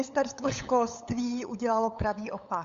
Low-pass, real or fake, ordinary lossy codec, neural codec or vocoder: 7.2 kHz; fake; Opus, 64 kbps; codec, 16 kHz, 8 kbps, FunCodec, trained on LibriTTS, 25 frames a second